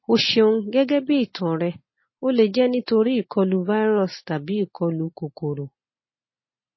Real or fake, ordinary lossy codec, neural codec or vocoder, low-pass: real; MP3, 24 kbps; none; 7.2 kHz